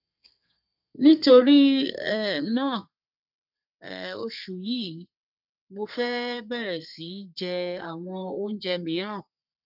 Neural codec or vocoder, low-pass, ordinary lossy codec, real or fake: codec, 32 kHz, 1.9 kbps, SNAC; 5.4 kHz; none; fake